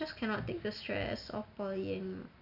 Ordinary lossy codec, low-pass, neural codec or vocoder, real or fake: none; 5.4 kHz; none; real